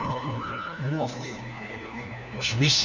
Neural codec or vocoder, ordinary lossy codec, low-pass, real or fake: codec, 16 kHz, 1 kbps, FunCodec, trained on LibriTTS, 50 frames a second; none; 7.2 kHz; fake